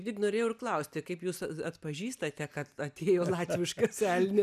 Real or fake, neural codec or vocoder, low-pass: real; none; 14.4 kHz